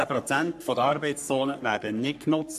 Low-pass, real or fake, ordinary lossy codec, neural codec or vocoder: 14.4 kHz; fake; none; codec, 44.1 kHz, 3.4 kbps, Pupu-Codec